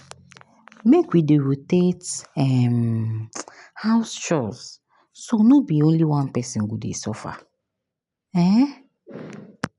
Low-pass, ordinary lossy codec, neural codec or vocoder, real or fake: 10.8 kHz; none; none; real